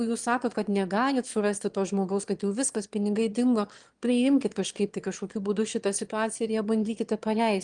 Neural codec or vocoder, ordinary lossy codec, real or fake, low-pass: autoencoder, 22.05 kHz, a latent of 192 numbers a frame, VITS, trained on one speaker; Opus, 24 kbps; fake; 9.9 kHz